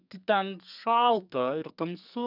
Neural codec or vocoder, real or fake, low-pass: codec, 32 kHz, 1.9 kbps, SNAC; fake; 5.4 kHz